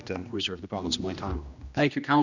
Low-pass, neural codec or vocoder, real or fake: 7.2 kHz; codec, 16 kHz, 1 kbps, X-Codec, HuBERT features, trained on general audio; fake